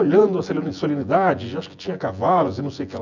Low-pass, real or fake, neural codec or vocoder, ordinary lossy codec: 7.2 kHz; fake; vocoder, 24 kHz, 100 mel bands, Vocos; none